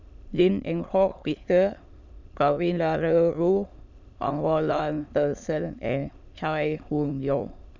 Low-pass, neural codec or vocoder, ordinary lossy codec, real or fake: 7.2 kHz; autoencoder, 22.05 kHz, a latent of 192 numbers a frame, VITS, trained on many speakers; none; fake